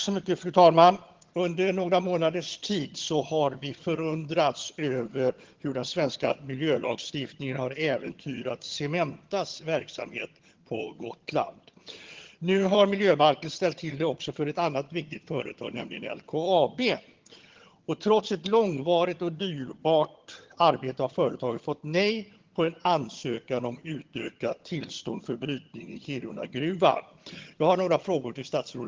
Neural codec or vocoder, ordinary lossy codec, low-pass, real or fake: vocoder, 22.05 kHz, 80 mel bands, HiFi-GAN; Opus, 16 kbps; 7.2 kHz; fake